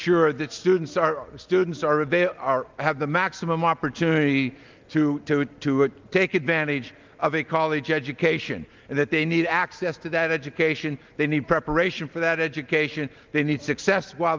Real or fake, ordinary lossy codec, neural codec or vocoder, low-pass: real; Opus, 32 kbps; none; 7.2 kHz